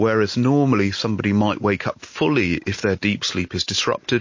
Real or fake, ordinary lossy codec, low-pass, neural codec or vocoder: real; MP3, 32 kbps; 7.2 kHz; none